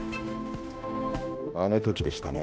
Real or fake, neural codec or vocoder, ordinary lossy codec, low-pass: fake; codec, 16 kHz, 1 kbps, X-Codec, HuBERT features, trained on balanced general audio; none; none